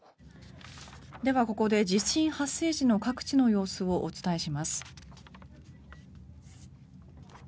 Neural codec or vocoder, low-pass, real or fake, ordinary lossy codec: none; none; real; none